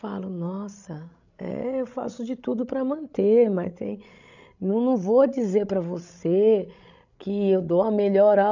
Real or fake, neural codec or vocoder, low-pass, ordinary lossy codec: fake; codec, 16 kHz, 16 kbps, FreqCodec, larger model; 7.2 kHz; none